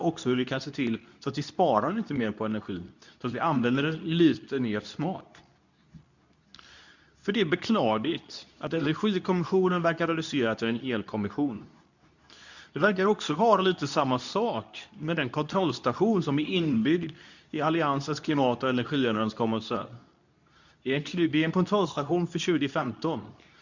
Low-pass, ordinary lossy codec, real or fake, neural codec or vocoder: 7.2 kHz; none; fake; codec, 24 kHz, 0.9 kbps, WavTokenizer, medium speech release version 2